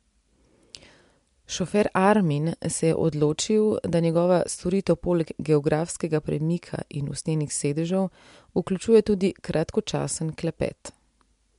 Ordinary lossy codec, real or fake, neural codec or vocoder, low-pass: MP3, 64 kbps; real; none; 10.8 kHz